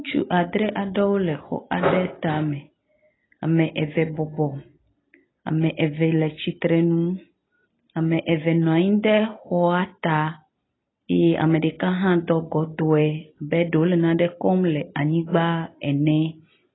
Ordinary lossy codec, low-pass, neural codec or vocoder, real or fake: AAC, 16 kbps; 7.2 kHz; none; real